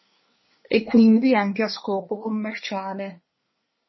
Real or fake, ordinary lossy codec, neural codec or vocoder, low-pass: fake; MP3, 24 kbps; codec, 24 kHz, 1 kbps, SNAC; 7.2 kHz